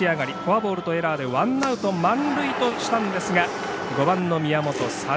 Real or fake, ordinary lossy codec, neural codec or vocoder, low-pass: real; none; none; none